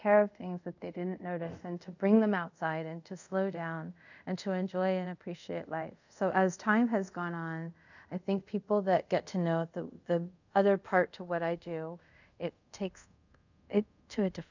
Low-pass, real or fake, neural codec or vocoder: 7.2 kHz; fake; codec, 24 kHz, 0.5 kbps, DualCodec